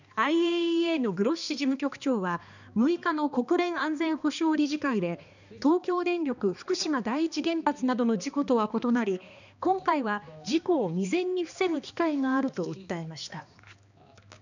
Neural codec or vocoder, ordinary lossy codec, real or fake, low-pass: codec, 16 kHz, 2 kbps, X-Codec, HuBERT features, trained on balanced general audio; none; fake; 7.2 kHz